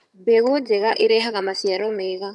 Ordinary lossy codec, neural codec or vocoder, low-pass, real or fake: none; vocoder, 22.05 kHz, 80 mel bands, HiFi-GAN; none; fake